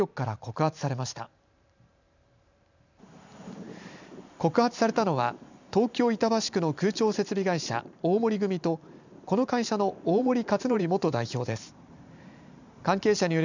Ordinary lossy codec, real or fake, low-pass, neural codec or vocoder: none; fake; 7.2 kHz; vocoder, 44.1 kHz, 128 mel bands every 512 samples, BigVGAN v2